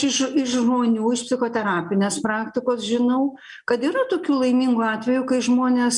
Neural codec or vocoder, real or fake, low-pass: none; real; 10.8 kHz